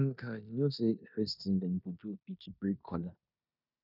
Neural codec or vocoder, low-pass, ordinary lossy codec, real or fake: codec, 16 kHz in and 24 kHz out, 0.9 kbps, LongCat-Audio-Codec, four codebook decoder; 5.4 kHz; none; fake